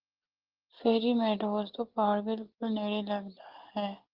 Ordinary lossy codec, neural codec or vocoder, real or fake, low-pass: Opus, 16 kbps; none; real; 5.4 kHz